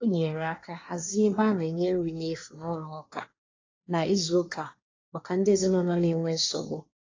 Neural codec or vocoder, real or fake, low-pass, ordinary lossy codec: codec, 24 kHz, 1 kbps, SNAC; fake; 7.2 kHz; AAC, 32 kbps